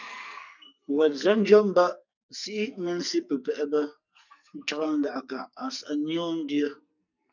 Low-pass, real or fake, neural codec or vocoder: 7.2 kHz; fake; codec, 44.1 kHz, 2.6 kbps, SNAC